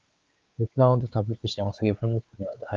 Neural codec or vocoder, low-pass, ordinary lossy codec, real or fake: codec, 16 kHz, 4 kbps, X-Codec, WavLM features, trained on Multilingual LibriSpeech; 7.2 kHz; Opus, 24 kbps; fake